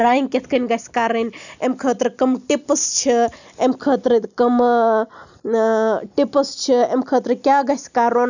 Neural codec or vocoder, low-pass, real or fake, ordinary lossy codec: none; 7.2 kHz; real; none